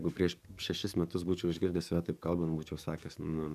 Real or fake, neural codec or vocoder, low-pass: fake; codec, 44.1 kHz, 7.8 kbps, DAC; 14.4 kHz